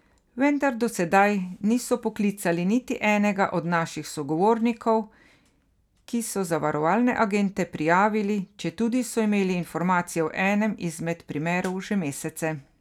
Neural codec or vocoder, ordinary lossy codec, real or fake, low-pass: none; none; real; 19.8 kHz